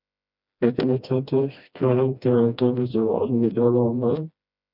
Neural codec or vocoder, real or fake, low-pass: codec, 16 kHz, 1 kbps, FreqCodec, smaller model; fake; 5.4 kHz